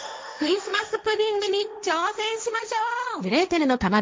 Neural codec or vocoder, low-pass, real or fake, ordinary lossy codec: codec, 16 kHz, 1.1 kbps, Voila-Tokenizer; none; fake; none